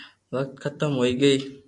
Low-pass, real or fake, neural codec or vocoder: 10.8 kHz; real; none